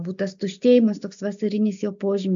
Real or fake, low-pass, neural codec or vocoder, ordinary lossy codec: real; 7.2 kHz; none; AAC, 64 kbps